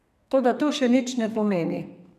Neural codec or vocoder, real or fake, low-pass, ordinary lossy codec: codec, 32 kHz, 1.9 kbps, SNAC; fake; 14.4 kHz; none